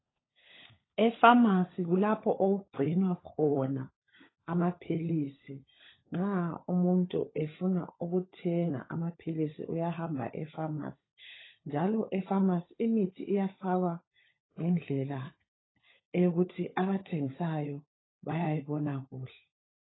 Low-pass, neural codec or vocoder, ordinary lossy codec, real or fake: 7.2 kHz; codec, 16 kHz, 16 kbps, FunCodec, trained on LibriTTS, 50 frames a second; AAC, 16 kbps; fake